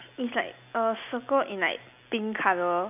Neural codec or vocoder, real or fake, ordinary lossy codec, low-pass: none; real; none; 3.6 kHz